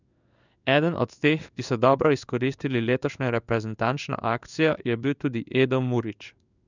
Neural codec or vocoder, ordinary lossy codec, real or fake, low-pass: codec, 16 kHz in and 24 kHz out, 1 kbps, XY-Tokenizer; none; fake; 7.2 kHz